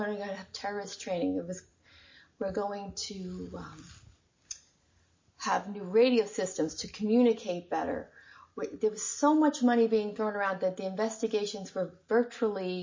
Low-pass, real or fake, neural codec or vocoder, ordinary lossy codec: 7.2 kHz; real; none; MP3, 32 kbps